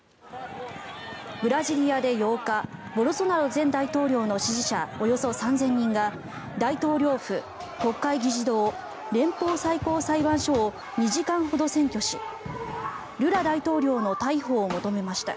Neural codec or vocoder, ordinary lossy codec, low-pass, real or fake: none; none; none; real